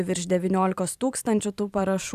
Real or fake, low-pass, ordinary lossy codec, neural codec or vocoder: fake; 14.4 kHz; Opus, 64 kbps; vocoder, 44.1 kHz, 128 mel bands every 512 samples, BigVGAN v2